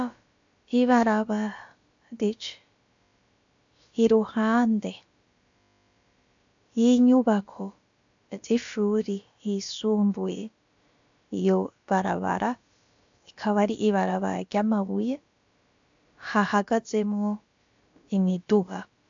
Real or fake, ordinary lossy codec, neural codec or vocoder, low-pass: fake; AAC, 64 kbps; codec, 16 kHz, about 1 kbps, DyCAST, with the encoder's durations; 7.2 kHz